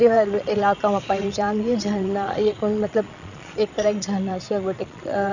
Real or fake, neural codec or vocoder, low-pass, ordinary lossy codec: fake; vocoder, 22.05 kHz, 80 mel bands, Vocos; 7.2 kHz; none